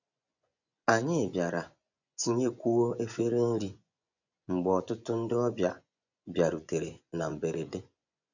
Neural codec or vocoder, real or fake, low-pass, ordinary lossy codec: vocoder, 24 kHz, 100 mel bands, Vocos; fake; 7.2 kHz; none